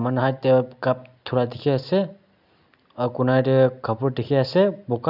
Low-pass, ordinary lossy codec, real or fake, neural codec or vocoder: 5.4 kHz; none; real; none